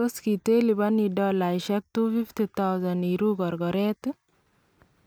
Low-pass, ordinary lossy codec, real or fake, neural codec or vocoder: none; none; real; none